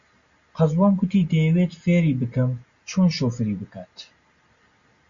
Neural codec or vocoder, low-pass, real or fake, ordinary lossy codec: none; 7.2 kHz; real; Opus, 64 kbps